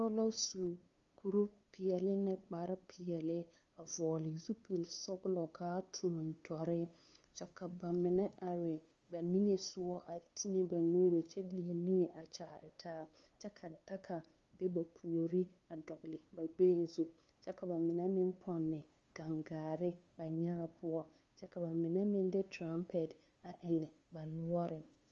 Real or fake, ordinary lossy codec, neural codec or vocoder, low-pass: fake; Opus, 32 kbps; codec, 16 kHz, 2 kbps, FunCodec, trained on LibriTTS, 25 frames a second; 7.2 kHz